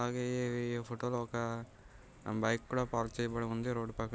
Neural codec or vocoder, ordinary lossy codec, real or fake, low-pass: none; none; real; none